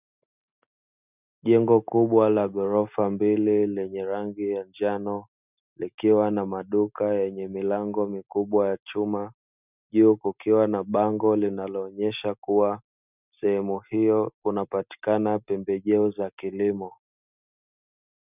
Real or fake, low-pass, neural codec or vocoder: real; 3.6 kHz; none